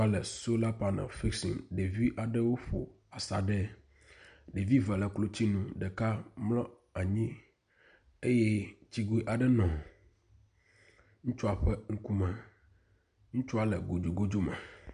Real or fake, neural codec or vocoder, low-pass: real; none; 9.9 kHz